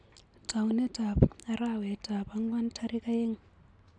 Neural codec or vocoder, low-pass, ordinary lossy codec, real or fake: none; 9.9 kHz; AAC, 64 kbps; real